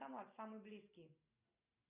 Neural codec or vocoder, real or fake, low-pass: none; real; 3.6 kHz